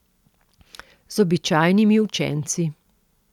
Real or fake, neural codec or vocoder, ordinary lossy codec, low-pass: real; none; none; 19.8 kHz